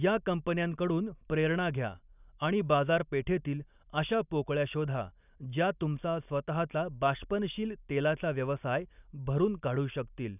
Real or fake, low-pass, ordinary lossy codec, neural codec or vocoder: real; 3.6 kHz; none; none